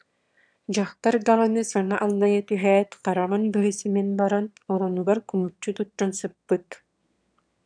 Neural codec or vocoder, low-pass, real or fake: autoencoder, 22.05 kHz, a latent of 192 numbers a frame, VITS, trained on one speaker; 9.9 kHz; fake